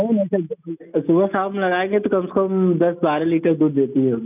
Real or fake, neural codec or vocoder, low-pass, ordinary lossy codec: real; none; 3.6 kHz; none